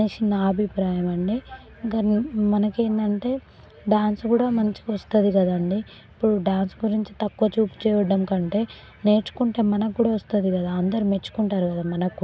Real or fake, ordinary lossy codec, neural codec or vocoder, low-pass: real; none; none; none